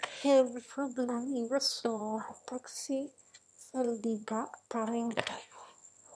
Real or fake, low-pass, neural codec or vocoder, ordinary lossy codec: fake; none; autoencoder, 22.05 kHz, a latent of 192 numbers a frame, VITS, trained on one speaker; none